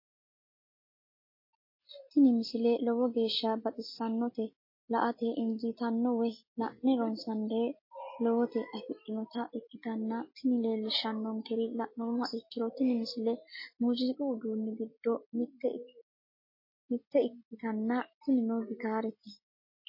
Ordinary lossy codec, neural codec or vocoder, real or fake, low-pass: MP3, 24 kbps; none; real; 5.4 kHz